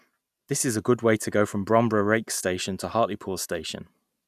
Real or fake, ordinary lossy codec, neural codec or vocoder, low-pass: real; none; none; 14.4 kHz